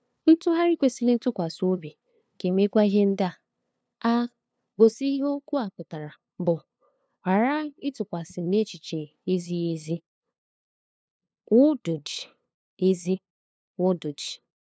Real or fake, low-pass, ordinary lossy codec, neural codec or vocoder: fake; none; none; codec, 16 kHz, 2 kbps, FunCodec, trained on LibriTTS, 25 frames a second